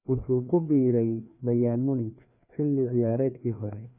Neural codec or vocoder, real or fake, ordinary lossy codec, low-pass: codec, 16 kHz, 2 kbps, FreqCodec, larger model; fake; none; 3.6 kHz